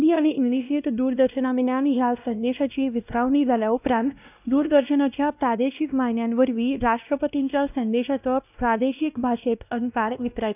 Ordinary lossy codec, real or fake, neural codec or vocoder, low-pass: none; fake; codec, 16 kHz, 1 kbps, X-Codec, WavLM features, trained on Multilingual LibriSpeech; 3.6 kHz